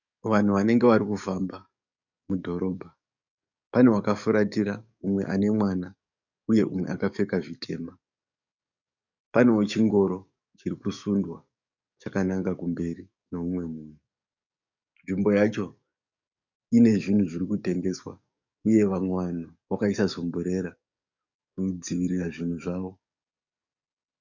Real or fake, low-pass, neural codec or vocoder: fake; 7.2 kHz; codec, 44.1 kHz, 7.8 kbps, DAC